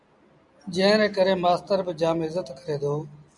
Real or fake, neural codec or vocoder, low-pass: real; none; 10.8 kHz